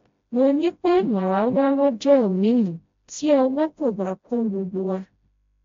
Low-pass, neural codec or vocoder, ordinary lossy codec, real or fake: 7.2 kHz; codec, 16 kHz, 0.5 kbps, FreqCodec, smaller model; MP3, 48 kbps; fake